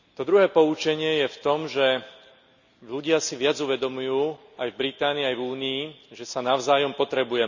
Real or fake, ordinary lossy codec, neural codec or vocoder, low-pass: real; none; none; 7.2 kHz